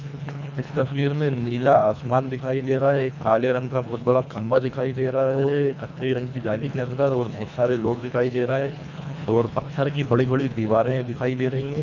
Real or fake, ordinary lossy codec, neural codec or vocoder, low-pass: fake; none; codec, 24 kHz, 1.5 kbps, HILCodec; 7.2 kHz